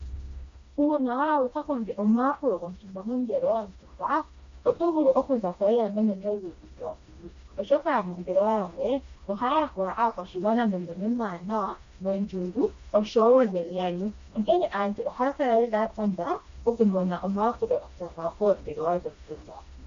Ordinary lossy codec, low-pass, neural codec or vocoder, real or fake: MP3, 48 kbps; 7.2 kHz; codec, 16 kHz, 1 kbps, FreqCodec, smaller model; fake